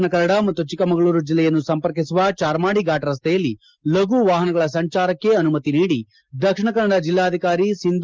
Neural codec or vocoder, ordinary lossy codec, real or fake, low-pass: none; Opus, 32 kbps; real; 7.2 kHz